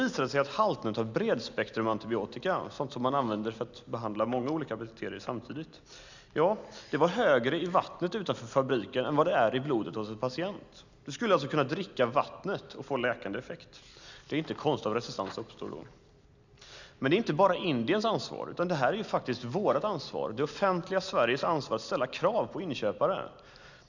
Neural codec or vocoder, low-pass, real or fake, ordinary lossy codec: none; 7.2 kHz; real; none